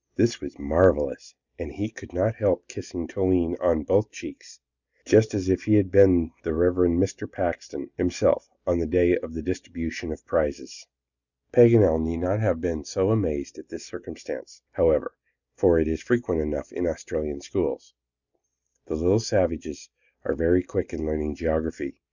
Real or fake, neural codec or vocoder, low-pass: real; none; 7.2 kHz